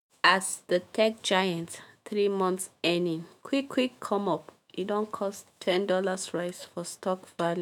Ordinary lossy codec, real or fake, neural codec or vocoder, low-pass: none; fake; autoencoder, 48 kHz, 128 numbers a frame, DAC-VAE, trained on Japanese speech; 19.8 kHz